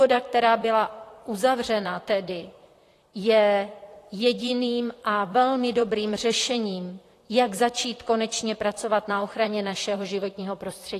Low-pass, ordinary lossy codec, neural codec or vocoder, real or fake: 14.4 kHz; AAC, 48 kbps; vocoder, 44.1 kHz, 128 mel bands, Pupu-Vocoder; fake